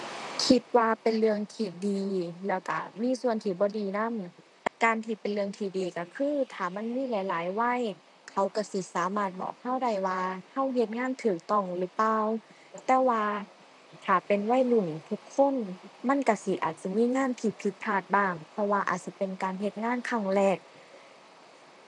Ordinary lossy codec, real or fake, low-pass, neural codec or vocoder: none; fake; 10.8 kHz; vocoder, 44.1 kHz, 128 mel bands, Pupu-Vocoder